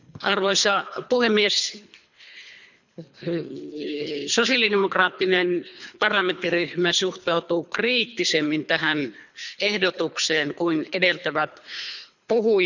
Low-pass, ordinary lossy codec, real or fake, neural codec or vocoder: 7.2 kHz; none; fake; codec, 24 kHz, 3 kbps, HILCodec